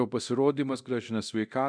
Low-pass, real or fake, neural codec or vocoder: 9.9 kHz; fake; codec, 24 kHz, 0.9 kbps, DualCodec